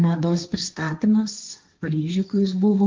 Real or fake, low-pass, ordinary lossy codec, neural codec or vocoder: fake; 7.2 kHz; Opus, 24 kbps; codec, 16 kHz, 1.1 kbps, Voila-Tokenizer